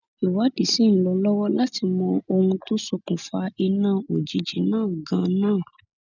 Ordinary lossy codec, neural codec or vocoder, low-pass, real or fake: none; none; 7.2 kHz; real